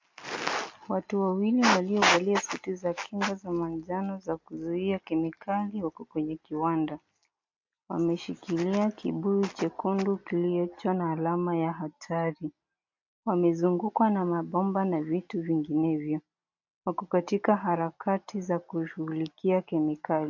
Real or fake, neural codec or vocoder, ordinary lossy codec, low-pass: real; none; MP3, 48 kbps; 7.2 kHz